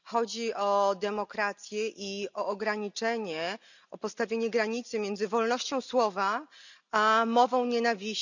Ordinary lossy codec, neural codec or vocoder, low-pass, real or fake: none; none; 7.2 kHz; real